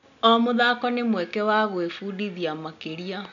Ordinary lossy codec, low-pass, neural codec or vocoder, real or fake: none; 7.2 kHz; none; real